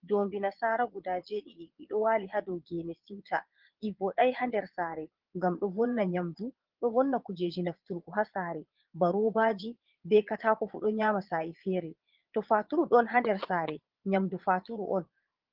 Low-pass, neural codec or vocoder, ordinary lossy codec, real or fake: 5.4 kHz; vocoder, 22.05 kHz, 80 mel bands, Vocos; Opus, 16 kbps; fake